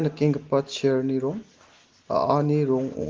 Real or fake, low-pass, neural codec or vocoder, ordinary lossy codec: real; 7.2 kHz; none; Opus, 16 kbps